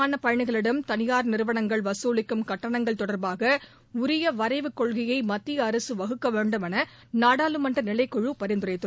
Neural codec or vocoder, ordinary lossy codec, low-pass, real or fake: none; none; none; real